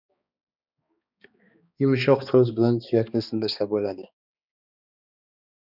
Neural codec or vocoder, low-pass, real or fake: codec, 16 kHz, 2 kbps, X-Codec, HuBERT features, trained on balanced general audio; 5.4 kHz; fake